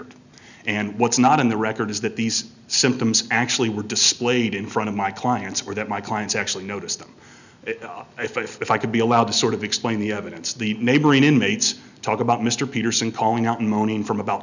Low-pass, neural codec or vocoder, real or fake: 7.2 kHz; none; real